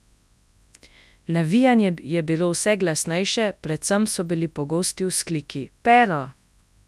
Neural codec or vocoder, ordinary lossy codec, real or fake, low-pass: codec, 24 kHz, 0.9 kbps, WavTokenizer, large speech release; none; fake; none